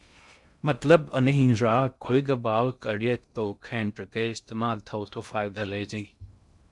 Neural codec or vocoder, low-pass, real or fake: codec, 16 kHz in and 24 kHz out, 0.6 kbps, FocalCodec, streaming, 2048 codes; 10.8 kHz; fake